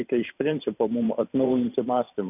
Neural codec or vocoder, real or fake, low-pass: vocoder, 44.1 kHz, 128 mel bands every 512 samples, BigVGAN v2; fake; 3.6 kHz